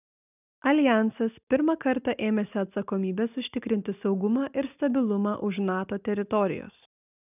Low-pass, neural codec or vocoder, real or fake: 3.6 kHz; none; real